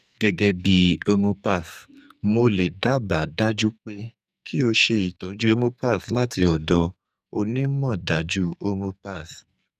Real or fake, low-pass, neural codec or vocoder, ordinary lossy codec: fake; 14.4 kHz; codec, 44.1 kHz, 2.6 kbps, SNAC; none